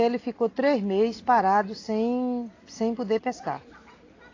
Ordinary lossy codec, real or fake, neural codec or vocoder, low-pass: AAC, 32 kbps; real; none; 7.2 kHz